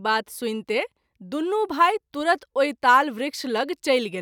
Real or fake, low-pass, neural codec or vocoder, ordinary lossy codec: real; 19.8 kHz; none; none